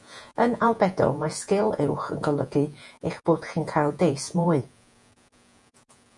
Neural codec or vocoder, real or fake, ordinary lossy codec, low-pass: vocoder, 48 kHz, 128 mel bands, Vocos; fake; MP3, 96 kbps; 10.8 kHz